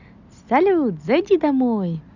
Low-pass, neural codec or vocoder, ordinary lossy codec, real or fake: 7.2 kHz; none; none; real